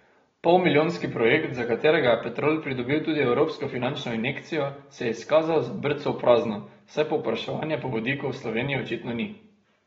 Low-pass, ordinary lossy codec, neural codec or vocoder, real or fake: 7.2 kHz; AAC, 24 kbps; none; real